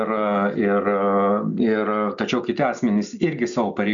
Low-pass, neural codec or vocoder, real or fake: 7.2 kHz; none; real